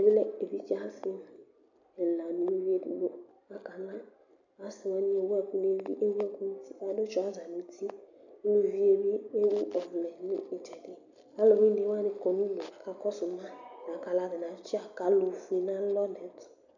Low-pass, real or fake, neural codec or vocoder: 7.2 kHz; real; none